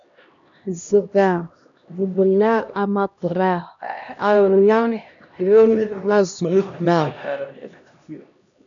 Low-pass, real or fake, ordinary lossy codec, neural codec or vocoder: 7.2 kHz; fake; MP3, 96 kbps; codec, 16 kHz, 1 kbps, X-Codec, HuBERT features, trained on LibriSpeech